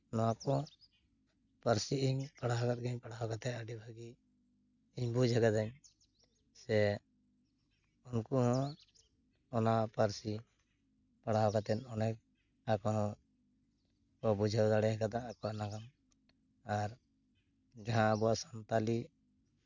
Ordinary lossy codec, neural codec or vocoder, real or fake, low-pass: none; none; real; 7.2 kHz